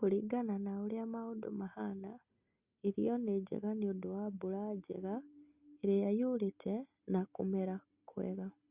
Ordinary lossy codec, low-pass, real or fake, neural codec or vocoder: Opus, 64 kbps; 3.6 kHz; real; none